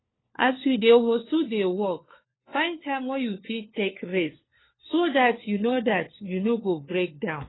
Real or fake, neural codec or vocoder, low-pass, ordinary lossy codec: fake; codec, 16 kHz, 4 kbps, FunCodec, trained on LibriTTS, 50 frames a second; 7.2 kHz; AAC, 16 kbps